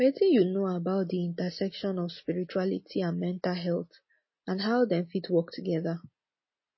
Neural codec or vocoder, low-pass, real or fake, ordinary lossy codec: none; 7.2 kHz; real; MP3, 24 kbps